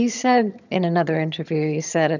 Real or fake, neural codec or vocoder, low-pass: fake; vocoder, 22.05 kHz, 80 mel bands, HiFi-GAN; 7.2 kHz